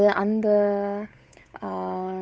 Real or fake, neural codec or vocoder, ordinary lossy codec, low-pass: fake; codec, 16 kHz, 8 kbps, FunCodec, trained on Chinese and English, 25 frames a second; none; none